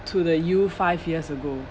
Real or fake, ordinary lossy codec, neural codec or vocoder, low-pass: real; none; none; none